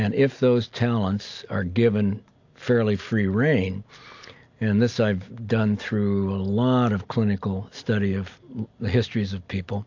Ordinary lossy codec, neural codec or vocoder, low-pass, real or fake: AAC, 48 kbps; none; 7.2 kHz; real